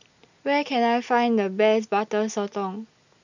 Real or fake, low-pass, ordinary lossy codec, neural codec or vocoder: real; 7.2 kHz; none; none